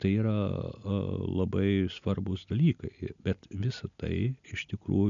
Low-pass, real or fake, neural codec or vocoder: 7.2 kHz; real; none